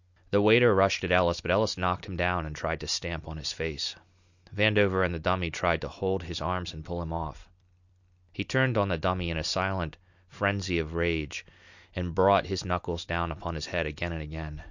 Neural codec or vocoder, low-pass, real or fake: none; 7.2 kHz; real